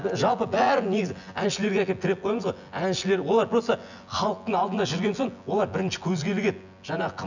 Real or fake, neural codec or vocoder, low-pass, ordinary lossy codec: fake; vocoder, 24 kHz, 100 mel bands, Vocos; 7.2 kHz; none